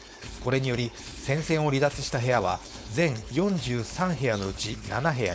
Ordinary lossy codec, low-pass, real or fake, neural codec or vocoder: none; none; fake; codec, 16 kHz, 4.8 kbps, FACodec